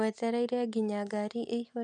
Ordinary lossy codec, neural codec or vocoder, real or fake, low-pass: none; none; real; 10.8 kHz